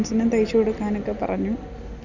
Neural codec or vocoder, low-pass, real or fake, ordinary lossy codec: none; 7.2 kHz; real; none